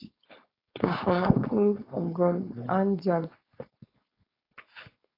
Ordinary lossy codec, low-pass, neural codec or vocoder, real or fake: AAC, 24 kbps; 5.4 kHz; codec, 16 kHz, 4.8 kbps, FACodec; fake